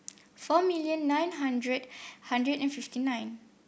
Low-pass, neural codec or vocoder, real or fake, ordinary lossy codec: none; none; real; none